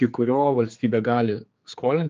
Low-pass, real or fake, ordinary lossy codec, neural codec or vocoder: 7.2 kHz; fake; Opus, 24 kbps; codec, 16 kHz, 4 kbps, X-Codec, HuBERT features, trained on general audio